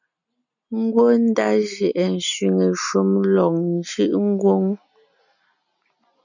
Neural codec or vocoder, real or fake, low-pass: none; real; 7.2 kHz